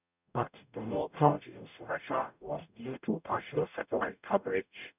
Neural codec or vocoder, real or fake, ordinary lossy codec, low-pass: codec, 44.1 kHz, 0.9 kbps, DAC; fake; none; 3.6 kHz